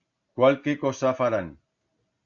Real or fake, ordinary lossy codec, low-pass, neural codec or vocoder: real; AAC, 64 kbps; 7.2 kHz; none